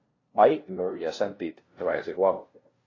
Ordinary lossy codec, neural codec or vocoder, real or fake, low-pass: AAC, 32 kbps; codec, 16 kHz, 0.5 kbps, FunCodec, trained on LibriTTS, 25 frames a second; fake; 7.2 kHz